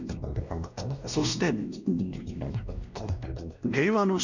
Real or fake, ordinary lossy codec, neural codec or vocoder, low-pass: fake; none; codec, 16 kHz, 1 kbps, X-Codec, WavLM features, trained on Multilingual LibriSpeech; 7.2 kHz